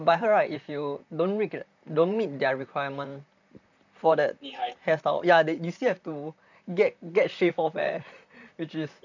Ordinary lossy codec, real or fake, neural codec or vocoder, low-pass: none; fake; vocoder, 44.1 kHz, 128 mel bands, Pupu-Vocoder; 7.2 kHz